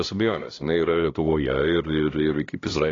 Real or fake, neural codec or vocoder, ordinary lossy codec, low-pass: fake; codec, 16 kHz, 1 kbps, X-Codec, HuBERT features, trained on LibriSpeech; AAC, 32 kbps; 7.2 kHz